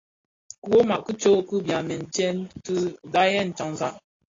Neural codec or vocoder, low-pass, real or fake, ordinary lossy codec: none; 7.2 kHz; real; AAC, 32 kbps